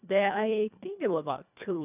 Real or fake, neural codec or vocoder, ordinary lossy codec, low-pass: fake; codec, 24 kHz, 1.5 kbps, HILCodec; none; 3.6 kHz